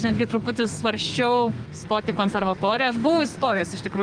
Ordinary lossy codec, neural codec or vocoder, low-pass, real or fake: Opus, 32 kbps; codec, 32 kHz, 1.9 kbps, SNAC; 9.9 kHz; fake